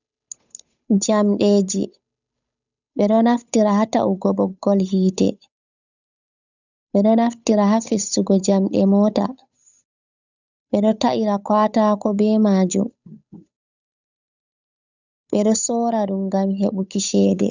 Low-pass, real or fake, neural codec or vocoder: 7.2 kHz; fake; codec, 16 kHz, 8 kbps, FunCodec, trained on Chinese and English, 25 frames a second